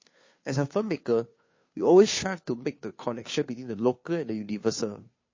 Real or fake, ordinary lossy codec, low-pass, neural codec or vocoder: fake; MP3, 32 kbps; 7.2 kHz; codec, 16 kHz, 2 kbps, FunCodec, trained on Chinese and English, 25 frames a second